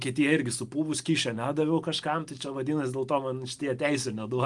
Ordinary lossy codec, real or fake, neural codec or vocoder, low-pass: Opus, 32 kbps; real; none; 10.8 kHz